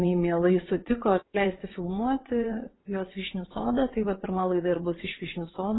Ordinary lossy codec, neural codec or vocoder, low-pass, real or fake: AAC, 16 kbps; vocoder, 22.05 kHz, 80 mel bands, WaveNeXt; 7.2 kHz; fake